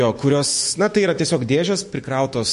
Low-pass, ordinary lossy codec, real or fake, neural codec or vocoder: 14.4 kHz; MP3, 48 kbps; fake; autoencoder, 48 kHz, 128 numbers a frame, DAC-VAE, trained on Japanese speech